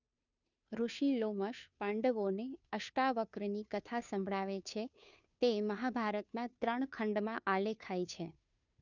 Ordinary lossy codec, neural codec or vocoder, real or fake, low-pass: none; codec, 16 kHz, 2 kbps, FunCodec, trained on Chinese and English, 25 frames a second; fake; 7.2 kHz